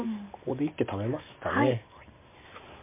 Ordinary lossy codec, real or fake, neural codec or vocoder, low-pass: MP3, 24 kbps; real; none; 3.6 kHz